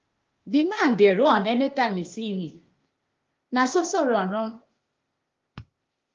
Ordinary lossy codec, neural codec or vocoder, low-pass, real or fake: Opus, 32 kbps; codec, 16 kHz, 0.8 kbps, ZipCodec; 7.2 kHz; fake